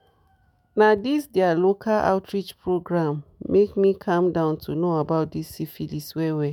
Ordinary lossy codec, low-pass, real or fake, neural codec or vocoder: MP3, 96 kbps; 19.8 kHz; fake; autoencoder, 48 kHz, 128 numbers a frame, DAC-VAE, trained on Japanese speech